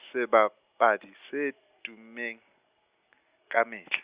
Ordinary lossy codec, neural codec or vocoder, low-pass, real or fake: Opus, 64 kbps; none; 3.6 kHz; real